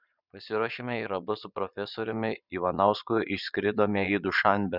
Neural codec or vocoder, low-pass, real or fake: vocoder, 22.05 kHz, 80 mel bands, Vocos; 5.4 kHz; fake